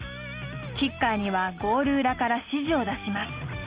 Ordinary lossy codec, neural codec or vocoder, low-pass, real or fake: Opus, 24 kbps; none; 3.6 kHz; real